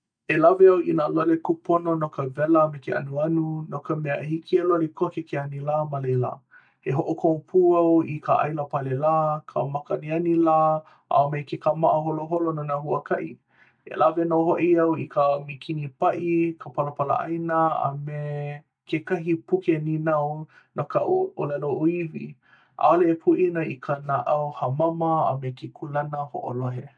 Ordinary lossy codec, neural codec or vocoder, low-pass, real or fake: none; none; 9.9 kHz; real